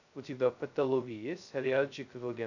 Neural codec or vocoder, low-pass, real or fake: codec, 16 kHz, 0.2 kbps, FocalCodec; 7.2 kHz; fake